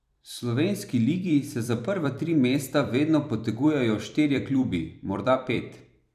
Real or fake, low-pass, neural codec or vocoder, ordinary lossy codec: fake; 14.4 kHz; vocoder, 44.1 kHz, 128 mel bands every 256 samples, BigVGAN v2; none